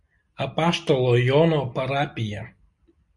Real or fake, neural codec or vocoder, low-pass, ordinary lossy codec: real; none; 10.8 kHz; MP3, 48 kbps